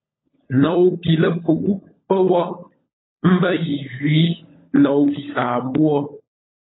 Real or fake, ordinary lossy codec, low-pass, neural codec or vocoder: fake; AAC, 16 kbps; 7.2 kHz; codec, 16 kHz, 16 kbps, FunCodec, trained on LibriTTS, 50 frames a second